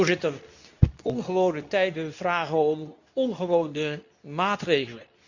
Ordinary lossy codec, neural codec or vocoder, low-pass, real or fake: none; codec, 24 kHz, 0.9 kbps, WavTokenizer, medium speech release version 2; 7.2 kHz; fake